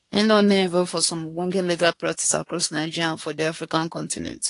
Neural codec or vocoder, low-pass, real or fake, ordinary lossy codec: codec, 24 kHz, 1 kbps, SNAC; 10.8 kHz; fake; AAC, 48 kbps